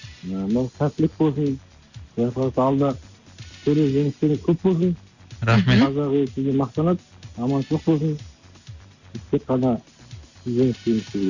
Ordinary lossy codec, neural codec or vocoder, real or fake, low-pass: none; none; real; 7.2 kHz